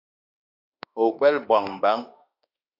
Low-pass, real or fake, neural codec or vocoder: 5.4 kHz; fake; autoencoder, 48 kHz, 32 numbers a frame, DAC-VAE, trained on Japanese speech